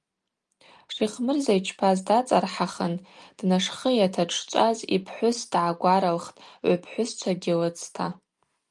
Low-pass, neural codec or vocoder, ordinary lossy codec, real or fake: 10.8 kHz; none; Opus, 32 kbps; real